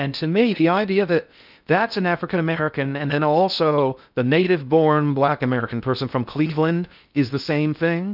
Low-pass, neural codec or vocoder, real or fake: 5.4 kHz; codec, 16 kHz in and 24 kHz out, 0.6 kbps, FocalCodec, streaming, 2048 codes; fake